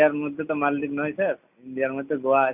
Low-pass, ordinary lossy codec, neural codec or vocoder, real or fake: 3.6 kHz; none; none; real